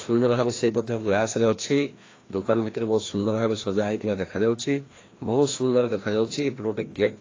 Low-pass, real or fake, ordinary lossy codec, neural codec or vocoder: 7.2 kHz; fake; AAC, 32 kbps; codec, 16 kHz, 1 kbps, FreqCodec, larger model